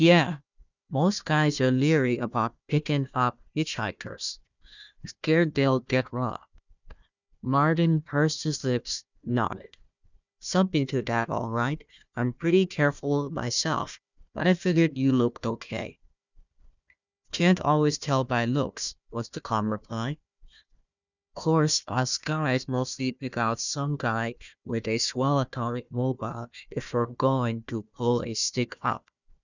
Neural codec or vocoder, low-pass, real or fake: codec, 16 kHz, 1 kbps, FunCodec, trained on Chinese and English, 50 frames a second; 7.2 kHz; fake